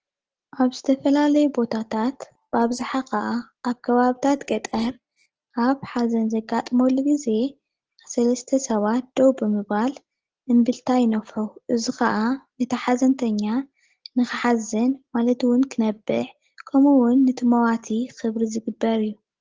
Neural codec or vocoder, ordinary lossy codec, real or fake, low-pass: none; Opus, 16 kbps; real; 7.2 kHz